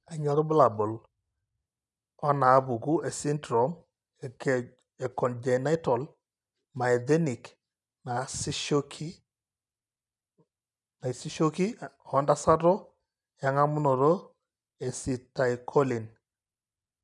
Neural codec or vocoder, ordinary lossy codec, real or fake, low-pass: none; none; real; 10.8 kHz